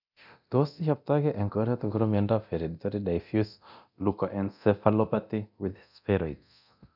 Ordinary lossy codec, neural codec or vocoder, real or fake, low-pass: none; codec, 24 kHz, 0.9 kbps, DualCodec; fake; 5.4 kHz